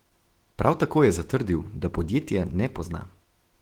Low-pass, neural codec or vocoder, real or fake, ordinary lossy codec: 19.8 kHz; autoencoder, 48 kHz, 128 numbers a frame, DAC-VAE, trained on Japanese speech; fake; Opus, 16 kbps